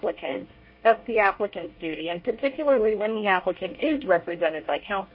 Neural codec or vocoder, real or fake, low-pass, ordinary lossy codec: codec, 24 kHz, 1 kbps, SNAC; fake; 5.4 kHz; MP3, 32 kbps